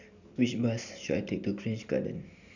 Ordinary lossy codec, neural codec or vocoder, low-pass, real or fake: none; none; 7.2 kHz; real